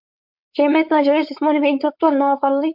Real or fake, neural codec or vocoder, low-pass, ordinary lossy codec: fake; codec, 16 kHz, 4.8 kbps, FACodec; 5.4 kHz; MP3, 48 kbps